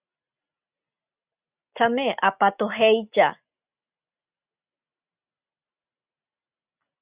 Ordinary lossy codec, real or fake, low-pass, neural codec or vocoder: Opus, 64 kbps; real; 3.6 kHz; none